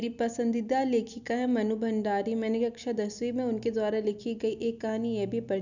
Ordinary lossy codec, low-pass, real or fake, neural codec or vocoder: none; 7.2 kHz; real; none